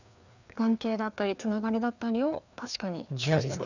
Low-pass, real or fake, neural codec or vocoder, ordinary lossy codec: 7.2 kHz; fake; codec, 16 kHz, 2 kbps, FreqCodec, larger model; none